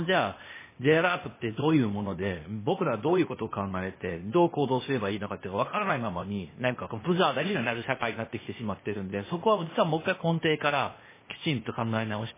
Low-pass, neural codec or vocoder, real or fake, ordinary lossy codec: 3.6 kHz; codec, 16 kHz, 0.8 kbps, ZipCodec; fake; MP3, 16 kbps